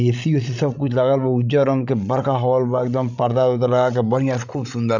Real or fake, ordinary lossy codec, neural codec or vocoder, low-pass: fake; none; codec, 16 kHz, 16 kbps, FreqCodec, larger model; 7.2 kHz